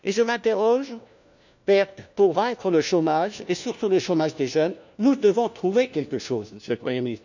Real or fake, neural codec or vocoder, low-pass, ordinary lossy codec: fake; codec, 16 kHz, 1 kbps, FunCodec, trained on LibriTTS, 50 frames a second; 7.2 kHz; none